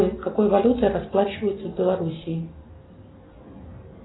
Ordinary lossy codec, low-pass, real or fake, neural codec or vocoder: AAC, 16 kbps; 7.2 kHz; real; none